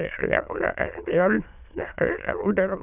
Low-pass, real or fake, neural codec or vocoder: 3.6 kHz; fake; autoencoder, 22.05 kHz, a latent of 192 numbers a frame, VITS, trained on many speakers